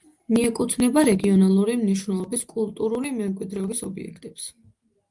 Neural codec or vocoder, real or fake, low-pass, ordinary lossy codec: none; real; 10.8 kHz; Opus, 32 kbps